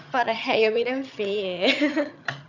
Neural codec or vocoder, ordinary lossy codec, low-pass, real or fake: vocoder, 22.05 kHz, 80 mel bands, HiFi-GAN; none; 7.2 kHz; fake